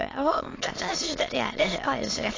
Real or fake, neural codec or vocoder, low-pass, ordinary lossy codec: fake; autoencoder, 22.05 kHz, a latent of 192 numbers a frame, VITS, trained on many speakers; 7.2 kHz; AAC, 32 kbps